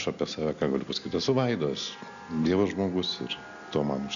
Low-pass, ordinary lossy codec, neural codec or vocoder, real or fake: 7.2 kHz; AAC, 96 kbps; none; real